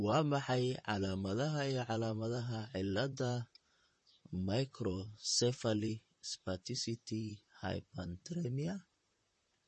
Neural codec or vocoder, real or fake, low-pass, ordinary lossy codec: vocoder, 44.1 kHz, 128 mel bands, Pupu-Vocoder; fake; 10.8 kHz; MP3, 32 kbps